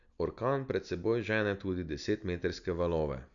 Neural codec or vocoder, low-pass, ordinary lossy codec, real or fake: none; 7.2 kHz; none; real